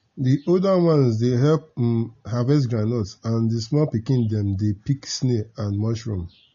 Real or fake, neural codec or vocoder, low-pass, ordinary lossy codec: real; none; 7.2 kHz; MP3, 32 kbps